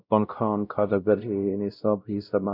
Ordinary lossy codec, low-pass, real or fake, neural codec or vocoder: none; 5.4 kHz; fake; codec, 16 kHz, 0.5 kbps, X-Codec, WavLM features, trained on Multilingual LibriSpeech